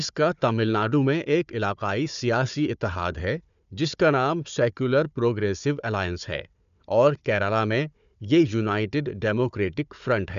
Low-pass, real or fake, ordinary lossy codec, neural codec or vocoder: 7.2 kHz; fake; MP3, 96 kbps; codec, 16 kHz, 4 kbps, FunCodec, trained on Chinese and English, 50 frames a second